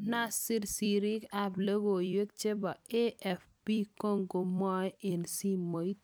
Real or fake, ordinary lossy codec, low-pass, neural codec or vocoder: fake; none; none; vocoder, 44.1 kHz, 128 mel bands every 256 samples, BigVGAN v2